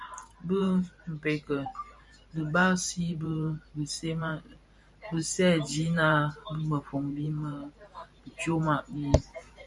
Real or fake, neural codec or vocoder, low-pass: fake; vocoder, 44.1 kHz, 128 mel bands every 512 samples, BigVGAN v2; 10.8 kHz